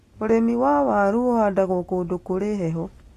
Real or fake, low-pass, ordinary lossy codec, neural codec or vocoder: real; 14.4 kHz; AAC, 48 kbps; none